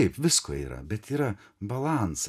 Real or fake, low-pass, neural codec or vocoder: real; 14.4 kHz; none